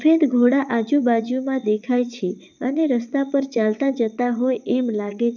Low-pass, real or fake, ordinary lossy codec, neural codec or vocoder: 7.2 kHz; fake; none; vocoder, 22.05 kHz, 80 mel bands, WaveNeXt